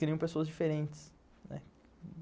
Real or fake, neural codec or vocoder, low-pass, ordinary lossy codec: real; none; none; none